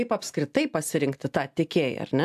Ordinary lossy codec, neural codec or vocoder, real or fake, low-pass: AAC, 96 kbps; none; real; 14.4 kHz